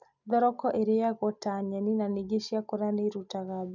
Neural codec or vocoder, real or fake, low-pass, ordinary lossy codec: none; real; 7.2 kHz; none